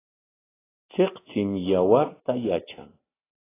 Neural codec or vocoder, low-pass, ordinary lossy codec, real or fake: none; 3.6 kHz; AAC, 16 kbps; real